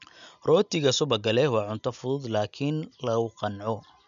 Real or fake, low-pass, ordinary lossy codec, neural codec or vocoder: real; 7.2 kHz; none; none